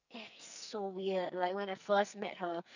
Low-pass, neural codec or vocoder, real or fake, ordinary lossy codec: 7.2 kHz; codec, 44.1 kHz, 2.6 kbps, SNAC; fake; none